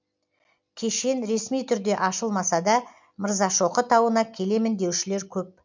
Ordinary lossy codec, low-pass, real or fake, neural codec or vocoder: MP3, 64 kbps; 7.2 kHz; real; none